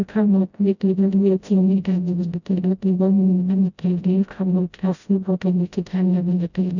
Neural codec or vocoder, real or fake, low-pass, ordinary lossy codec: codec, 16 kHz, 0.5 kbps, FreqCodec, smaller model; fake; 7.2 kHz; none